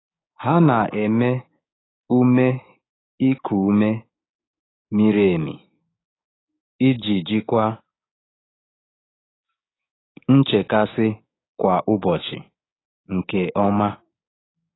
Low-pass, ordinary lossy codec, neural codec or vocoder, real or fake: 7.2 kHz; AAC, 16 kbps; codec, 16 kHz, 6 kbps, DAC; fake